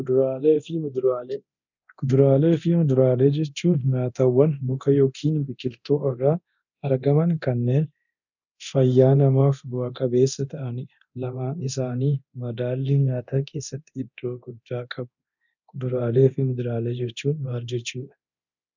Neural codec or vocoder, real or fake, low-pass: codec, 24 kHz, 0.9 kbps, DualCodec; fake; 7.2 kHz